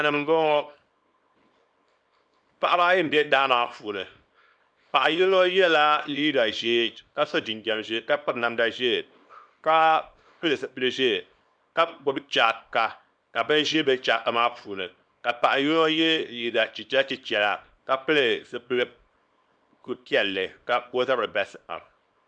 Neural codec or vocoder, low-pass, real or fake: codec, 24 kHz, 0.9 kbps, WavTokenizer, small release; 9.9 kHz; fake